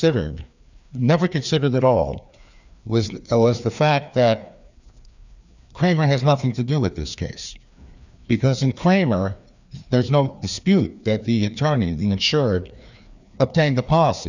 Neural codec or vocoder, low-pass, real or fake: codec, 16 kHz, 2 kbps, FreqCodec, larger model; 7.2 kHz; fake